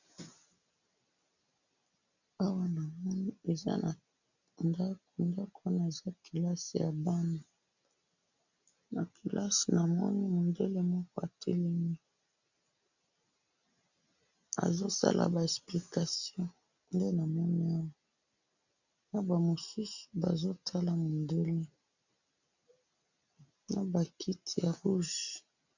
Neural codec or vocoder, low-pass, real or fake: none; 7.2 kHz; real